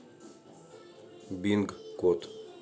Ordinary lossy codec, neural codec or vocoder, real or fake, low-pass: none; none; real; none